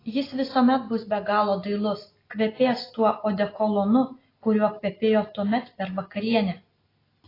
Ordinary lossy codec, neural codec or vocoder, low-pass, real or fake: AAC, 24 kbps; none; 5.4 kHz; real